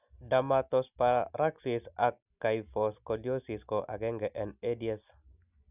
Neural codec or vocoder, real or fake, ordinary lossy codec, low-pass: none; real; none; 3.6 kHz